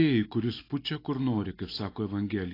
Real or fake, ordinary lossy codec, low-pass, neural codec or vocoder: real; AAC, 24 kbps; 5.4 kHz; none